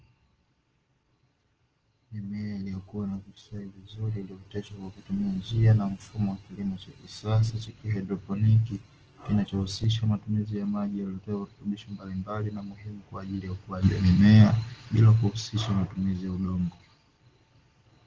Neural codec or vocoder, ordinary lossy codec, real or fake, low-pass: none; Opus, 16 kbps; real; 7.2 kHz